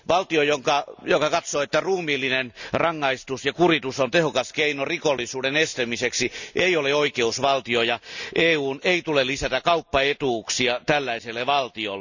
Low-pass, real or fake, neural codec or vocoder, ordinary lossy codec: 7.2 kHz; real; none; none